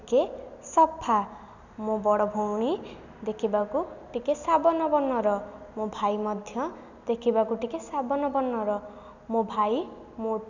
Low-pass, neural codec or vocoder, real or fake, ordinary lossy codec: 7.2 kHz; none; real; none